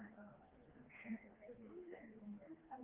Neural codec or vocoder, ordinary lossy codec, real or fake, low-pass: codec, 16 kHz, 2 kbps, FreqCodec, smaller model; Opus, 16 kbps; fake; 3.6 kHz